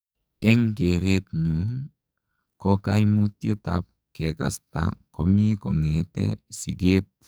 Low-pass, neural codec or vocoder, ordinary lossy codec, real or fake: none; codec, 44.1 kHz, 2.6 kbps, SNAC; none; fake